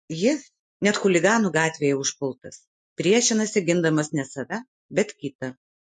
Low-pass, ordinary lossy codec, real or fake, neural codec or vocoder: 10.8 kHz; MP3, 48 kbps; real; none